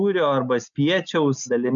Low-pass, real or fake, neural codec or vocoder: 7.2 kHz; real; none